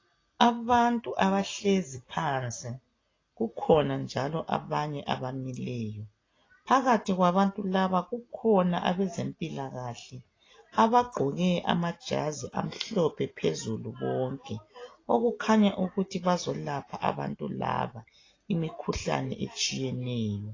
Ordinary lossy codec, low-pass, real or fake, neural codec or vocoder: AAC, 32 kbps; 7.2 kHz; real; none